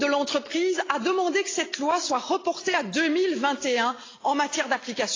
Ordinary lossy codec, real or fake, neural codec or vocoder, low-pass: AAC, 32 kbps; real; none; 7.2 kHz